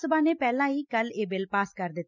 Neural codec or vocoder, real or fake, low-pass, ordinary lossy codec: none; real; 7.2 kHz; none